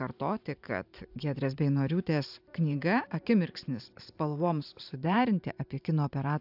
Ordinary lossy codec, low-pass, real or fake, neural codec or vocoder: AAC, 48 kbps; 5.4 kHz; fake; vocoder, 44.1 kHz, 128 mel bands every 512 samples, BigVGAN v2